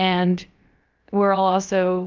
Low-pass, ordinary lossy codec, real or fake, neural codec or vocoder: 7.2 kHz; Opus, 24 kbps; fake; codec, 16 kHz, about 1 kbps, DyCAST, with the encoder's durations